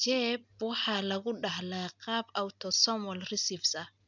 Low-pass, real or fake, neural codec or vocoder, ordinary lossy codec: 7.2 kHz; real; none; none